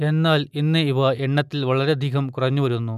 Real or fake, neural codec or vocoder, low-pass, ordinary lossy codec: real; none; 14.4 kHz; none